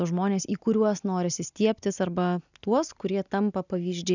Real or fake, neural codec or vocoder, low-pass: real; none; 7.2 kHz